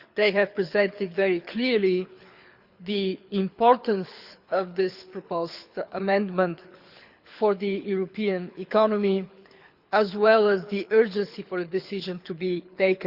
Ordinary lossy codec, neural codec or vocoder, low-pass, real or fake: Opus, 64 kbps; codec, 24 kHz, 6 kbps, HILCodec; 5.4 kHz; fake